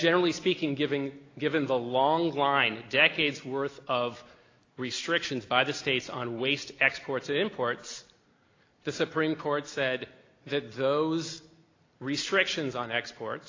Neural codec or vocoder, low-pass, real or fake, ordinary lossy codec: none; 7.2 kHz; real; AAC, 32 kbps